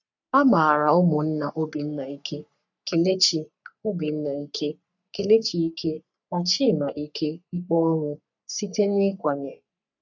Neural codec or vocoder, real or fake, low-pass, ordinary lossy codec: codec, 44.1 kHz, 3.4 kbps, Pupu-Codec; fake; 7.2 kHz; none